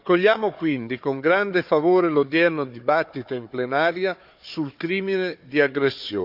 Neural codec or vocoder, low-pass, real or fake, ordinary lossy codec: codec, 16 kHz, 4 kbps, FunCodec, trained on Chinese and English, 50 frames a second; 5.4 kHz; fake; none